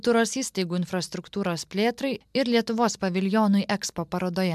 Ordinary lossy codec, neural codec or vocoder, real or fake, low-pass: MP3, 96 kbps; none; real; 14.4 kHz